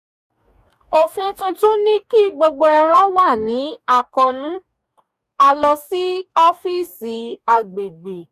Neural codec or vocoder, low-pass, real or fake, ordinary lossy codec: codec, 44.1 kHz, 2.6 kbps, DAC; 14.4 kHz; fake; none